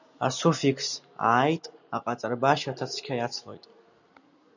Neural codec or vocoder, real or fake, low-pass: none; real; 7.2 kHz